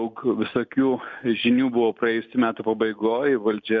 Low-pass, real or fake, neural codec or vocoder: 7.2 kHz; real; none